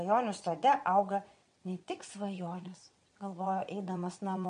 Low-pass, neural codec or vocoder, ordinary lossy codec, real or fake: 9.9 kHz; vocoder, 22.05 kHz, 80 mel bands, WaveNeXt; MP3, 48 kbps; fake